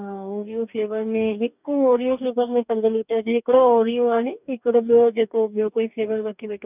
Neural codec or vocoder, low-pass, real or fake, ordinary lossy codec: codec, 44.1 kHz, 2.6 kbps, DAC; 3.6 kHz; fake; none